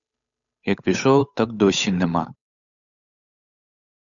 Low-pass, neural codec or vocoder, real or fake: 7.2 kHz; codec, 16 kHz, 8 kbps, FunCodec, trained on Chinese and English, 25 frames a second; fake